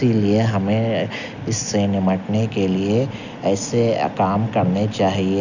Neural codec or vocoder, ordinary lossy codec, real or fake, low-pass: none; none; real; 7.2 kHz